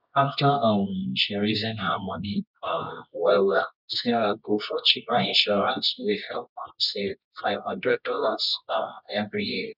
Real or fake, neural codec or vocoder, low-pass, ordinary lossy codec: fake; codec, 24 kHz, 0.9 kbps, WavTokenizer, medium music audio release; 5.4 kHz; none